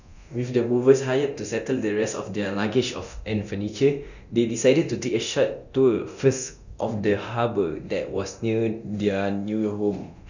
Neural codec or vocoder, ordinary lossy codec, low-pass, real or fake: codec, 24 kHz, 0.9 kbps, DualCodec; none; 7.2 kHz; fake